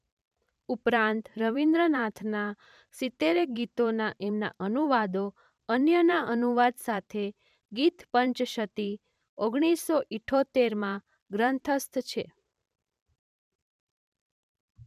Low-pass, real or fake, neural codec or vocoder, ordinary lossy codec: 14.4 kHz; fake; vocoder, 44.1 kHz, 128 mel bands every 512 samples, BigVGAN v2; none